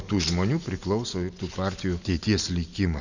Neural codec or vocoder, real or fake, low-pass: none; real; 7.2 kHz